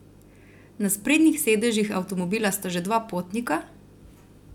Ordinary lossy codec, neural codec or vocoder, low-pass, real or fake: none; none; 19.8 kHz; real